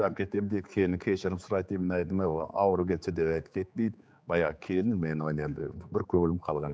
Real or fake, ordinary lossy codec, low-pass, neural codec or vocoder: fake; none; none; codec, 16 kHz, 4 kbps, X-Codec, HuBERT features, trained on general audio